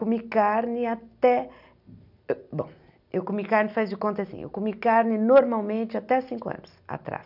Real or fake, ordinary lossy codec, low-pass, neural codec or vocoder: real; none; 5.4 kHz; none